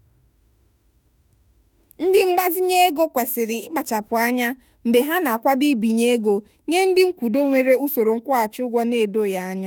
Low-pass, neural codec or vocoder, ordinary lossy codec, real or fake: none; autoencoder, 48 kHz, 32 numbers a frame, DAC-VAE, trained on Japanese speech; none; fake